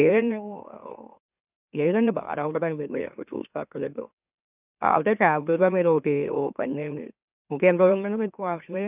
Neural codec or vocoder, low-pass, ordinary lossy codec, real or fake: autoencoder, 44.1 kHz, a latent of 192 numbers a frame, MeloTTS; 3.6 kHz; none; fake